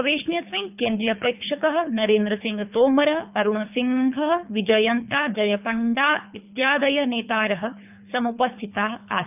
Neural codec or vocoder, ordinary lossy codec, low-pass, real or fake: codec, 24 kHz, 3 kbps, HILCodec; none; 3.6 kHz; fake